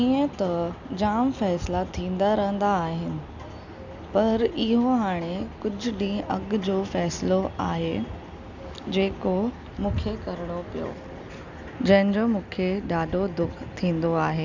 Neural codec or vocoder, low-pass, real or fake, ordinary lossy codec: none; 7.2 kHz; real; none